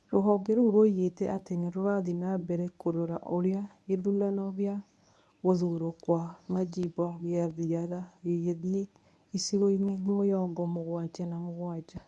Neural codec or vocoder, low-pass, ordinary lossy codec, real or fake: codec, 24 kHz, 0.9 kbps, WavTokenizer, medium speech release version 1; none; none; fake